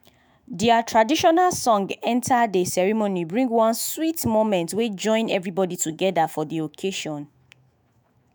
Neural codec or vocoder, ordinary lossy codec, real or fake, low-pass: autoencoder, 48 kHz, 128 numbers a frame, DAC-VAE, trained on Japanese speech; none; fake; none